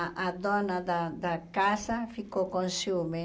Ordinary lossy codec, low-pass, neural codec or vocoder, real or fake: none; none; none; real